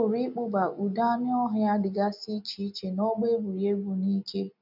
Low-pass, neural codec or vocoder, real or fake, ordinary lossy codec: 5.4 kHz; none; real; none